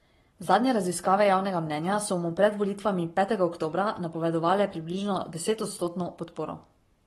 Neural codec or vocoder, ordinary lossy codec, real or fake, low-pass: codec, 44.1 kHz, 7.8 kbps, Pupu-Codec; AAC, 32 kbps; fake; 19.8 kHz